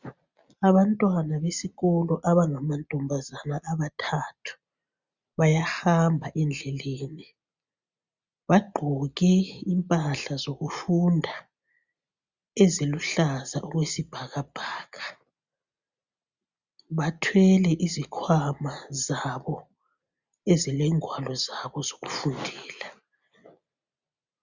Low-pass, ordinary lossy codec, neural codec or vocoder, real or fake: 7.2 kHz; Opus, 64 kbps; none; real